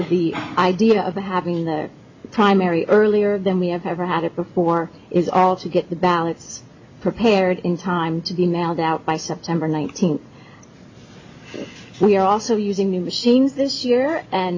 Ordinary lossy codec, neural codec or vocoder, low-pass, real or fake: MP3, 48 kbps; none; 7.2 kHz; real